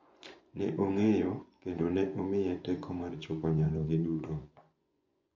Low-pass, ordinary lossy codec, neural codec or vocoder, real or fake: 7.2 kHz; MP3, 48 kbps; vocoder, 24 kHz, 100 mel bands, Vocos; fake